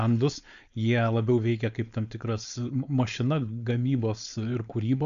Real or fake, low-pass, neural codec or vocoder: fake; 7.2 kHz; codec, 16 kHz, 4.8 kbps, FACodec